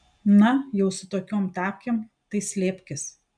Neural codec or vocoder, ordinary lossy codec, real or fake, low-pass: none; MP3, 96 kbps; real; 9.9 kHz